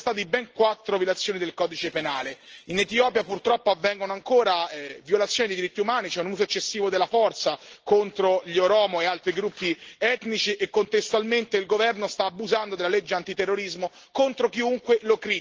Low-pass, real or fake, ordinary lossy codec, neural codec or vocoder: 7.2 kHz; real; Opus, 16 kbps; none